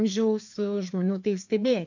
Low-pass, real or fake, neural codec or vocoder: 7.2 kHz; fake; codec, 16 kHz, 2 kbps, FreqCodec, larger model